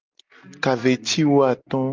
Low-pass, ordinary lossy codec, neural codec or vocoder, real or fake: 7.2 kHz; Opus, 24 kbps; none; real